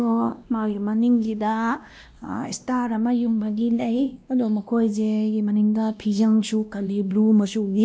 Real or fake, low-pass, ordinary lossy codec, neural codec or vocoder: fake; none; none; codec, 16 kHz, 1 kbps, X-Codec, WavLM features, trained on Multilingual LibriSpeech